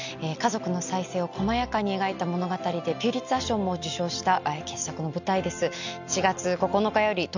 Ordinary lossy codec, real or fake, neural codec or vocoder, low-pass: none; real; none; 7.2 kHz